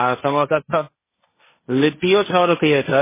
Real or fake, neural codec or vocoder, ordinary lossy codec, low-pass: fake; codec, 16 kHz, 1.1 kbps, Voila-Tokenizer; MP3, 16 kbps; 3.6 kHz